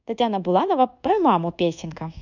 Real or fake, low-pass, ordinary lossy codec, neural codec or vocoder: fake; 7.2 kHz; none; codec, 24 kHz, 1.2 kbps, DualCodec